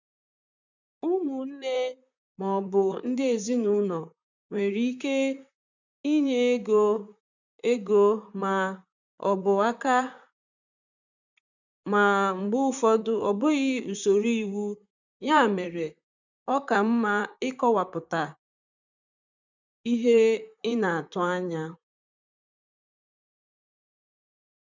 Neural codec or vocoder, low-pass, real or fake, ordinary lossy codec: vocoder, 44.1 kHz, 128 mel bands, Pupu-Vocoder; 7.2 kHz; fake; none